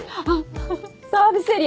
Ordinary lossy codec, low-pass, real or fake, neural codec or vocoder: none; none; real; none